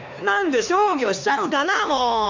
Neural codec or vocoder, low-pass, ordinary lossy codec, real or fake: codec, 16 kHz, 2 kbps, X-Codec, HuBERT features, trained on LibriSpeech; 7.2 kHz; MP3, 64 kbps; fake